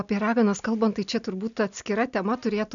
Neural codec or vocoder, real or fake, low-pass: none; real; 7.2 kHz